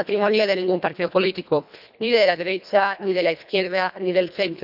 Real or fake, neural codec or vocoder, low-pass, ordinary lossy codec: fake; codec, 24 kHz, 1.5 kbps, HILCodec; 5.4 kHz; none